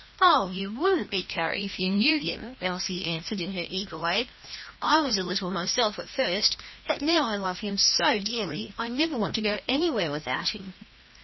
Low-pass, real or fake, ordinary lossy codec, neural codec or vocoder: 7.2 kHz; fake; MP3, 24 kbps; codec, 16 kHz, 1 kbps, FreqCodec, larger model